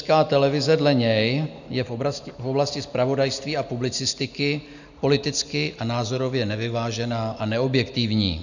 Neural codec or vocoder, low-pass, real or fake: none; 7.2 kHz; real